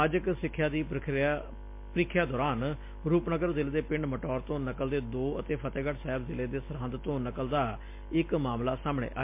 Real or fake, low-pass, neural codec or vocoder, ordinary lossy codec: real; 3.6 kHz; none; MP3, 24 kbps